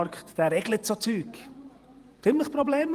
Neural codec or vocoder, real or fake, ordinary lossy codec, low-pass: vocoder, 48 kHz, 128 mel bands, Vocos; fake; Opus, 32 kbps; 14.4 kHz